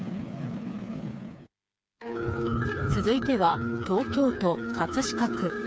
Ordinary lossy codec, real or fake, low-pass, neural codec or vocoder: none; fake; none; codec, 16 kHz, 4 kbps, FreqCodec, smaller model